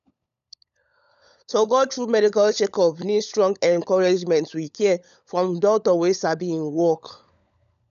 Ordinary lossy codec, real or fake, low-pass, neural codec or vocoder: none; fake; 7.2 kHz; codec, 16 kHz, 16 kbps, FunCodec, trained on LibriTTS, 50 frames a second